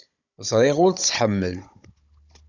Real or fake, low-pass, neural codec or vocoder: fake; 7.2 kHz; codec, 16 kHz, 16 kbps, FunCodec, trained on Chinese and English, 50 frames a second